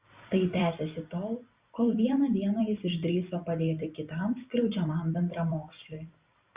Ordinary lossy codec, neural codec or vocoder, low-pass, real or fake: Opus, 64 kbps; vocoder, 44.1 kHz, 128 mel bands every 256 samples, BigVGAN v2; 3.6 kHz; fake